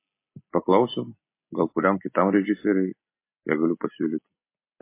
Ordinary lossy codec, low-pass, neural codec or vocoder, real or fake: MP3, 24 kbps; 3.6 kHz; none; real